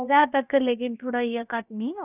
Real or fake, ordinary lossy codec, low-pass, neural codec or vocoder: fake; none; 3.6 kHz; codec, 16 kHz, about 1 kbps, DyCAST, with the encoder's durations